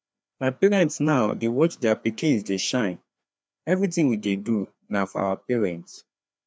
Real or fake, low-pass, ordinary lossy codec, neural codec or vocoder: fake; none; none; codec, 16 kHz, 2 kbps, FreqCodec, larger model